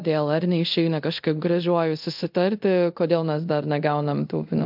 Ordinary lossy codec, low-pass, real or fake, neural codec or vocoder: MP3, 48 kbps; 5.4 kHz; fake; codec, 24 kHz, 0.5 kbps, DualCodec